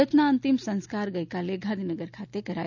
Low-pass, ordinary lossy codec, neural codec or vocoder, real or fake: 7.2 kHz; none; none; real